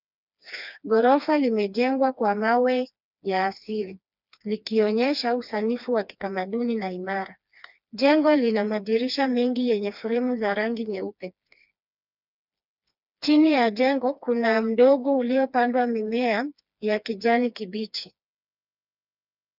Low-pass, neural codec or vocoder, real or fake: 5.4 kHz; codec, 16 kHz, 2 kbps, FreqCodec, smaller model; fake